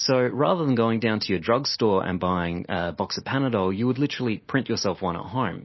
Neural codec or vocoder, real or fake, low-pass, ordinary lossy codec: none; real; 7.2 kHz; MP3, 24 kbps